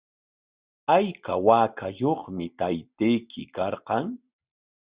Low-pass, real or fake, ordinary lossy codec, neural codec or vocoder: 3.6 kHz; real; Opus, 64 kbps; none